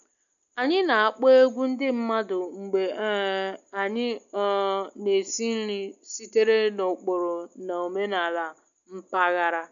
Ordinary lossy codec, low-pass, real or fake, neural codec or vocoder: none; 7.2 kHz; real; none